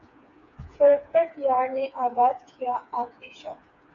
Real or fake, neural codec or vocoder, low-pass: fake; codec, 16 kHz, 4 kbps, FreqCodec, smaller model; 7.2 kHz